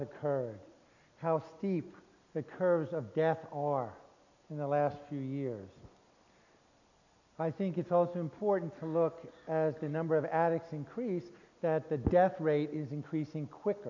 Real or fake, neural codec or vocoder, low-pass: real; none; 7.2 kHz